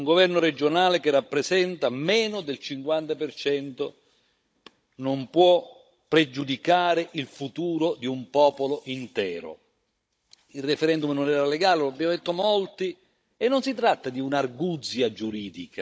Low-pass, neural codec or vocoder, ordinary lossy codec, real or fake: none; codec, 16 kHz, 16 kbps, FunCodec, trained on Chinese and English, 50 frames a second; none; fake